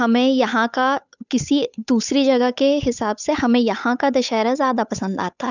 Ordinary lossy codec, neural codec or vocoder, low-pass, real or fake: none; codec, 16 kHz, 8 kbps, FunCodec, trained on Chinese and English, 25 frames a second; 7.2 kHz; fake